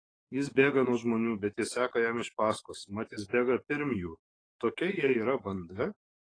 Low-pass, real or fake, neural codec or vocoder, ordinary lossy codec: 9.9 kHz; fake; codec, 44.1 kHz, 7.8 kbps, DAC; AAC, 32 kbps